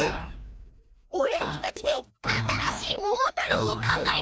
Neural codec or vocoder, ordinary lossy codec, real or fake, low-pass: codec, 16 kHz, 1 kbps, FreqCodec, larger model; none; fake; none